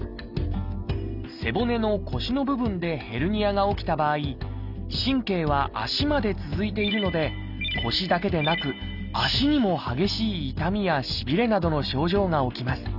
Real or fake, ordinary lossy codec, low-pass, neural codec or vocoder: real; none; 5.4 kHz; none